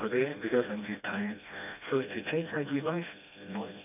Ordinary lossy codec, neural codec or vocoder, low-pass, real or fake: none; codec, 16 kHz, 1 kbps, FreqCodec, smaller model; 3.6 kHz; fake